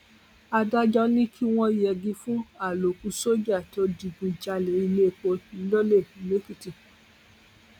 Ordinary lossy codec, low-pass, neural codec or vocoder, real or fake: none; 19.8 kHz; none; real